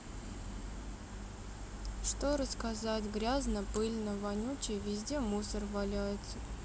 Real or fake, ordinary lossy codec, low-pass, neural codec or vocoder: real; none; none; none